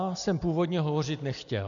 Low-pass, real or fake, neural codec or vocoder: 7.2 kHz; real; none